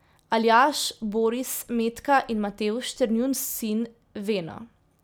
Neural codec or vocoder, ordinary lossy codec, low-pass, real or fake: none; none; none; real